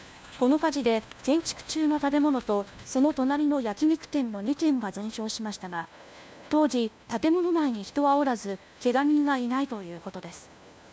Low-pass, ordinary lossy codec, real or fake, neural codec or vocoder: none; none; fake; codec, 16 kHz, 1 kbps, FunCodec, trained on LibriTTS, 50 frames a second